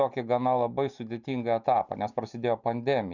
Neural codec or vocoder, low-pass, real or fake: none; 7.2 kHz; real